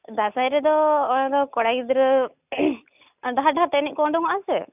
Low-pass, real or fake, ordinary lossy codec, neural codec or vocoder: 3.6 kHz; real; none; none